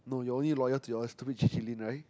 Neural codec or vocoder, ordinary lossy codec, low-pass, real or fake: none; none; none; real